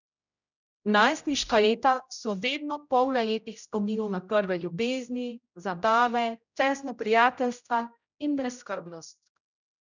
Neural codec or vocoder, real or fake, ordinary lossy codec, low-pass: codec, 16 kHz, 0.5 kbps, X-Codec, HuBERT features, trained on general audio; fake; none; 7.2 kHz